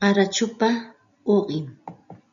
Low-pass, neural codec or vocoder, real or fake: 7.2 kHz; none; real